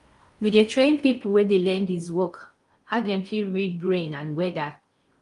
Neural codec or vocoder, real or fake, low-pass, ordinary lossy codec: codec, 16 kHz in and 24 kHz out, 0.6 kbps, FocalCodec, streaming, 4096 codes; fake; 10.8 kHz; Opus, 32 kbps